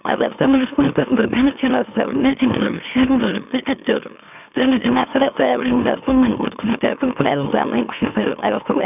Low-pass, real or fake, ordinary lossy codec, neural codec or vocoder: 3.6 kHz; fake; none; autoencoder, 44.1 kHz, a latent of 192 numbers a frame, MeloTTS